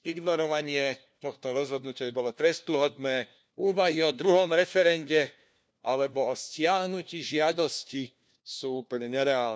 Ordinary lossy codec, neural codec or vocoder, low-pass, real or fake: none; codec, 16 kHz, 1 kbps, FunCodec, trained on LibriTTS, 50 frames a second; none; fake